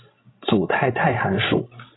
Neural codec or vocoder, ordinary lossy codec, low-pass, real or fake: codec, 16 kHz, 16 kbps, FreqCodec, larger model; AAC, 16 kbps; 7.2 kHz; fake